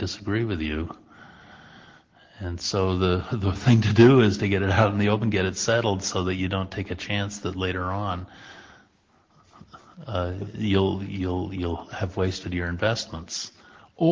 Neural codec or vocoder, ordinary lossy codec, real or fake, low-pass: none; Opus, 16 kbps; real; 7.2 kHz